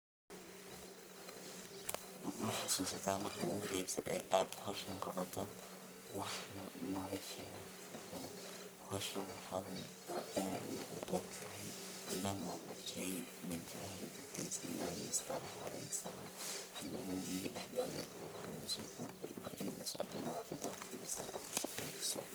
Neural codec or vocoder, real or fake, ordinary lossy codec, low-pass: codec, 44.1 kHz, 1.7 kbps, Pupu-Codec; fake; none; none